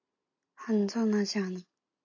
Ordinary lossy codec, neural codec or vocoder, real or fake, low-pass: AAC, 48 kbps; none; real; 7.2 kHz